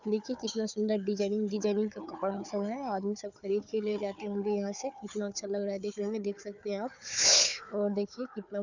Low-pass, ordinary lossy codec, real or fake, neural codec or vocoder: 7.2 kHz; none; fake; codec, 16 kHz, 4 kbps, FunCodec, trained on Chinese and English, 50 frames a second